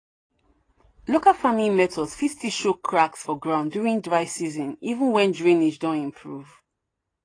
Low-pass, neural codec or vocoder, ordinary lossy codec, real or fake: 9.9 kHz; none; AAC, 32 kbps; real